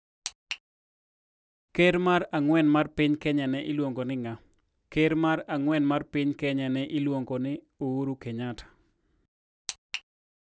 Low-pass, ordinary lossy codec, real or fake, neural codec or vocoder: none; none; real; none